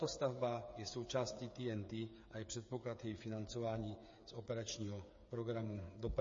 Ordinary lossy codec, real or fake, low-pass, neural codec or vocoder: MP3, 32 kbps; fake; 7.2 kHz; codec, 16 kHz, 8 kbps, FreqCodec, smaller model